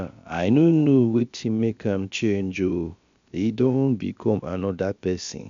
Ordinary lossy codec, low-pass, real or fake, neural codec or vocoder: MP3, 64 kbps; 7.2 kHz; fake; codec, 16 kHz, about 1 kbps, DyCAST, with the encoder's durations